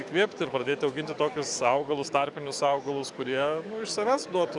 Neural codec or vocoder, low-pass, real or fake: codec, 44.1 kHz, 7.8 kbps, DAC; 10.8 kHz; fake